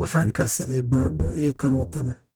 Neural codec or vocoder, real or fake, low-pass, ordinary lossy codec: codec, 44.1 kHz, 0.9 kbps, DAC; fake; none; none